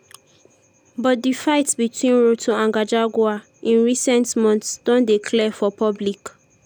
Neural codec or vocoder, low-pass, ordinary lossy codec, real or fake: none; none; none; real